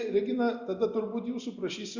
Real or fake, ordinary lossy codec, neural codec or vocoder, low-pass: real; Opus, 64 kbps; none; 7.2 kHz